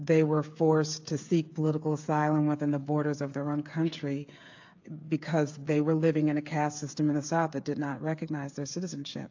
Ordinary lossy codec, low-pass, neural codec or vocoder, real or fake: AAC, 48 kbps; 7.2 kHz; codec, 16 kHz, 8 kbps, FreqCodec, smaller model; fake